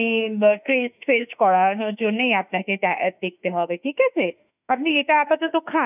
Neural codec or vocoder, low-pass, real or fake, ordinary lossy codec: autoencoder, 48 kHz, 32 numbers a frame, DAC-VAE, trained on Japanese speech; 3.6 kHz; fake; none